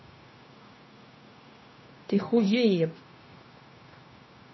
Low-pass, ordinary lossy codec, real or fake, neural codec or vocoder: 7.2 kHz; MP3, 24 kbps; fake; codec, 16 kHz, 0.9 kbps, LongCat-Audio-Codec